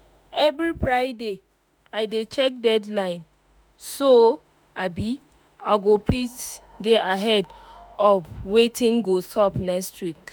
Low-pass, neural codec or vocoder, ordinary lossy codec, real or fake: none; autoencoder, 48 kHz, 32 numbers a frame, DAC-VAE, trained on Japanese speech; none; fake